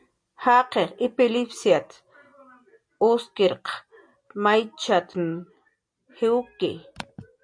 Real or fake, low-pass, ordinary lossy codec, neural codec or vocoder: real; 9.9 kHz; MP3, 64 kbps; none